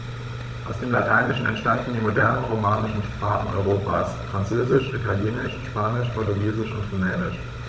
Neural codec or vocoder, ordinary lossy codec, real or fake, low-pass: codec, 16 kHz, 16 kbps, FunCodec, trained on Chinese and English, 50 frames a second; none; fake; none